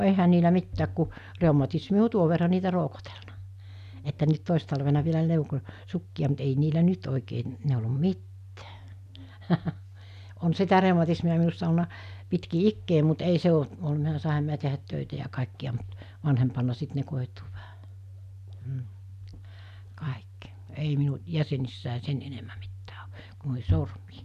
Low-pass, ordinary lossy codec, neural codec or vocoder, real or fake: 14.4 kHz; none; none; real